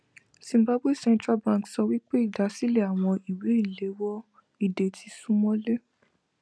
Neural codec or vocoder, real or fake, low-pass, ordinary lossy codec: none; real; none; none